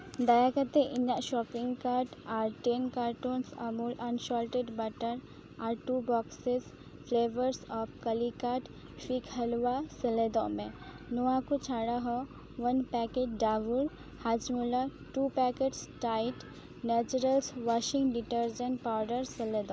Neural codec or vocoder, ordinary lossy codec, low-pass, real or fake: none; none; none; real